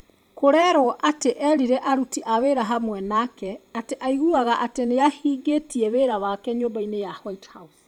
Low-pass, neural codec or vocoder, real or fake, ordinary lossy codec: 19.8 kHz; vocoder, 44.1 kHz, 128 mel bands every 512 samples, BigVGAN v2; fake; none